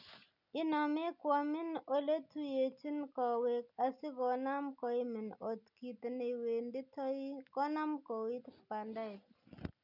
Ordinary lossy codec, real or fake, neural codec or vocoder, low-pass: none; real; none; 5.4 kHz